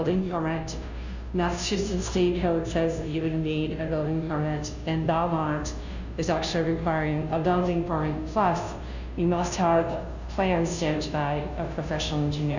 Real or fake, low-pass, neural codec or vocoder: fake; 7.2 kHz; codec, 16 kHz, 0.5 kbps, FunCodec, trained on Chinese and English, 25 frames a second